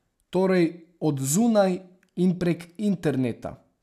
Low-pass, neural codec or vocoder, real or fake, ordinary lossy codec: 14.4 kHz; none; real; none